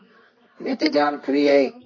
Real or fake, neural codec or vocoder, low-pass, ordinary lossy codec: fake; codec, 24 kHz, 0.9 kbps, WavTokenizer, medium music audio release; 7.2 kHz; MP3, 32 kbps